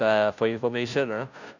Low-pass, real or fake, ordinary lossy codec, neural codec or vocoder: 7.2 kHz; fake; none; codec, 16 kHz, 0.5 kbps, FunCodec, trained on Chinese and English, 25 frames a second